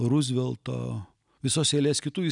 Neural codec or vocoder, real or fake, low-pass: none; real; 10.8 kHz